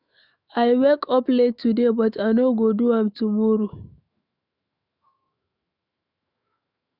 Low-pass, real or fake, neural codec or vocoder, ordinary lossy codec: 5.4 kHz; fake; autoencoder, 48 kHz, 128 numbers a frame, DAC-VAE, trained on Japanese speech; none